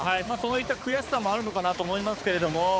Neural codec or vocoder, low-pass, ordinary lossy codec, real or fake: codec, 16 kHz, 4 kbps, X-Codec, HuBERT features, trained on balanced general audio; none; none; fake